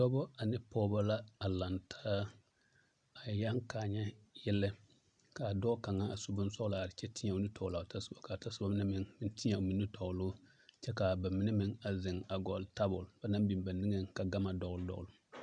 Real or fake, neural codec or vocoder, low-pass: real; none; 9.9 kHz